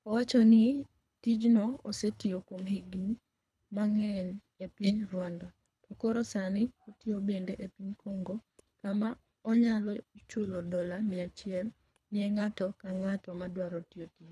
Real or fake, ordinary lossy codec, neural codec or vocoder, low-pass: fake; none; codec, 24 kHz, 3 kbps, HILCodec; none